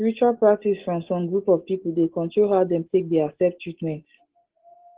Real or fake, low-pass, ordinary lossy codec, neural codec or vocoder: real; 3.6 kHz; Opus, 16 kbps; none